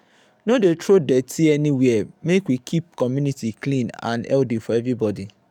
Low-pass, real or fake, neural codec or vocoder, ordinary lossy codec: 19.8 kHz; fake; codec, 44.1 kHz, 7.8 kbps, DAC; none